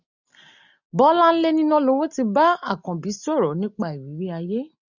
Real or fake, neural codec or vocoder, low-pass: real; none; 7.2 kHz